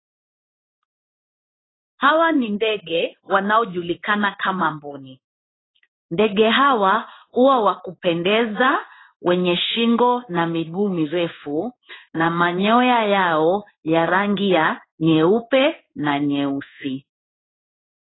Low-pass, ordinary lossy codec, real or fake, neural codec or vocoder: 7.2 kHz; AAC, 16 kbps; fake; codec, 16 kHz in and 24 kHz out, 1 kbps, XY-Tokenizer